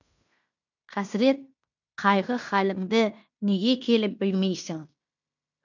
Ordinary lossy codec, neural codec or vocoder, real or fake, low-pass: none; codec, 16 kHz in and 24 kHz out, 0.9 kbps, LongCat-Audio-Codec, fine tuned four codebook decoder; fake; 7.2 kHz